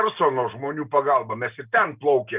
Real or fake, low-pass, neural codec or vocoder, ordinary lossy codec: real; 3.6 kHz; none; Opus, 24 kbps